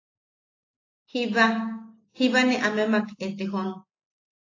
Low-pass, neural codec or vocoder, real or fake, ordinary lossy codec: 7.2 kHz; none; real; AAC, 32 kbps